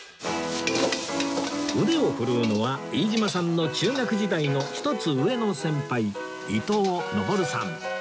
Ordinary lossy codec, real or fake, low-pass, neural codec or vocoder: none; real; none; none